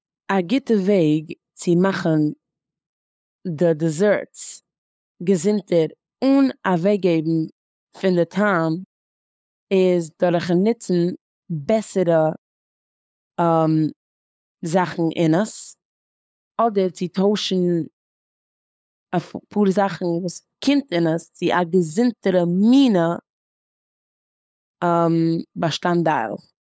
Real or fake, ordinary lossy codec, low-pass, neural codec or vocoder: fake; none; none; codec, 16 kHz, 8 kbps, FunCodec, trained on LibriTTS, 25 frames a second